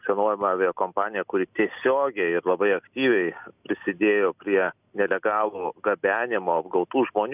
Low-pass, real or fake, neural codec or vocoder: 3.6 kHz; real; none